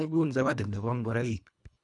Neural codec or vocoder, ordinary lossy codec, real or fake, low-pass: codec, 24 kHz, 1.5 kbps, HILCodec; none; fake; 10.8 kHz